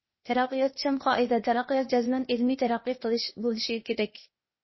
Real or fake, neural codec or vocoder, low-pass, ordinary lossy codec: fake; codec, 16 kHz, 0.8 kbps, ZipCodec; 7.2 kHz; MP3, 24 kbps